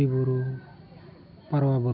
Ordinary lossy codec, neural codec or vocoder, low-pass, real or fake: none; none; 5.4 kHz; real